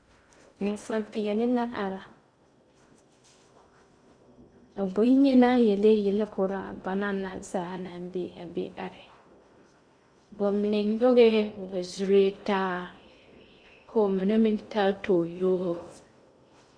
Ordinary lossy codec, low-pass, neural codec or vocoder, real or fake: Opus, 64 kbps; 9.9 kHz; codec, 16 kHz in and 24 kHz out, 0.6 kbps, FocalCodec, streaming, 2048 codes; fake